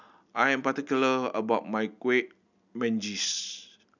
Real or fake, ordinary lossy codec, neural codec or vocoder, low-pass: real; none; none; 7.2 kHz